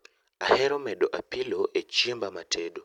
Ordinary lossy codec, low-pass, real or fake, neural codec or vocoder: none; 19.8 kHz; real; none